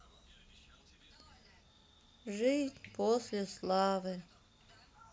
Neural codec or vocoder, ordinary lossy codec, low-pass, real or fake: none; none; none; real